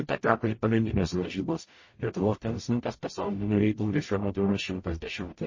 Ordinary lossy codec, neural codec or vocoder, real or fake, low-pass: MP3, 32 kbps; codec, 44.1 kHz, 0.9 kbps, DAC; fake; 7.2 kHz